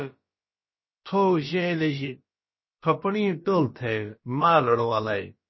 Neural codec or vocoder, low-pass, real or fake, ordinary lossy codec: codec, 16 kHz, about 1 kbps, DyCAST, with the encoder's durations; 7.2 kHz; fake; MP3, 24 kbps